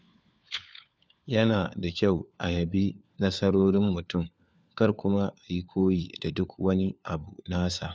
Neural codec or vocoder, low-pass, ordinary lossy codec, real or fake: codec, 16 kHz, 4 kbps, FunCodec, trained on LibriTTS, 50 frames a second; none; none; fake